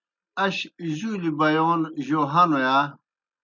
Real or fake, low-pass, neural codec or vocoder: real; 7.2 kHz; none